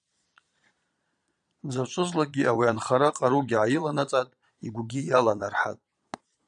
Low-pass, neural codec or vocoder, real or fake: 9.9 kHz; vocoder, 22.05 kHz, 80 mel bands, Vocos; fake